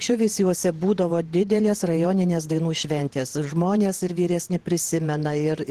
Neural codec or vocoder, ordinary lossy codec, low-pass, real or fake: vocoder, 48 kHz, 128 mel bands, Vocos; Opus, 16 kbps; 14.4 kHz; fake